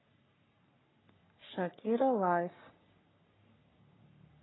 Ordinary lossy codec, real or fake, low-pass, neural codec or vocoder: AAC, 16 kbps; fake; 7.2 kHz; codec, 44.1 kHz, 3.4 kbps, Pupu-Codec